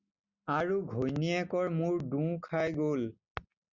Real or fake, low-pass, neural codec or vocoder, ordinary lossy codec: real; 7.2 kHz; none; Opus, 64 kbps